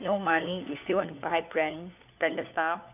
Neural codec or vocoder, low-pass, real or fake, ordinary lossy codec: codec, 16 kHz, 4 kbps, FunCodec, trained on LibriTTS, 50 frames a second; 3.6 kHz; fake; none